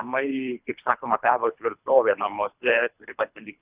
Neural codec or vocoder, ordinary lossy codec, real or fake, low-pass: codec, 24 kHz, 3 kbps, HILCodec; Opus, 64 kbps; fake; 3.6 kHz